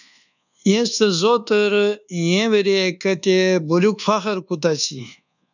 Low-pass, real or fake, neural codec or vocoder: 7.2 kHz; fake; codec, 24 kHz, 1.2 kbps, DualCodec